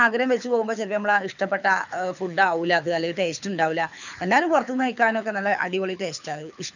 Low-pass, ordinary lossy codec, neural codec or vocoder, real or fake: 7.2 kHz; none; codec, 24 kHz, 6 kbps, HILCodec; fake